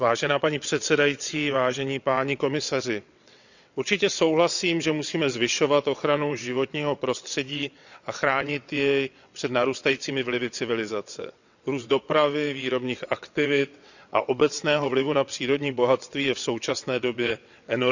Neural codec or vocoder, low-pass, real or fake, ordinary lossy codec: vocoder, 22.05 kHz, 80 mel bands, WaveNeXt; 7.2 kHz; fake; none